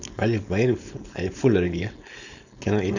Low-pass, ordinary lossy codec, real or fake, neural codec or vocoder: 7.2 kHz; none; fake; codec, 16 kHz, 4.8 kbps, FACodec